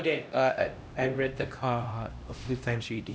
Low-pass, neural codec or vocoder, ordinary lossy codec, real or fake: none; codec, 16 kHz, 1 kbps, X-Codec, HuBERT features, trained on LibriSpeech; none; fake